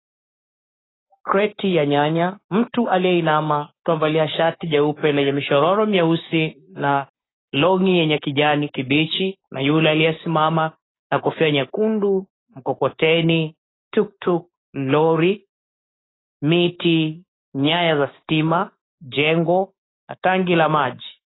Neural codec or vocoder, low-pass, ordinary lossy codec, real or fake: codec, 16 kHz, 6 kbps, DAC; 7.2 kHz; AAC, 16 kbps; fake